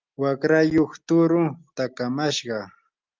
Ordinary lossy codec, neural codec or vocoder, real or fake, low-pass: Opus, 32 kbps; none; real; 7.2 kHz